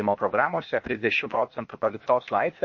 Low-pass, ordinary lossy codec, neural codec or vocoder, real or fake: 7.2 kHz; MP3, 32 kbps; codec, 16 kHz, 0.8 kbps, ZipCodec; fake